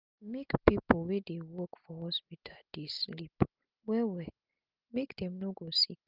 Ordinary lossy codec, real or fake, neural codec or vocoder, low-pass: Opus, 32 kbps; real; none; 5.4 kHz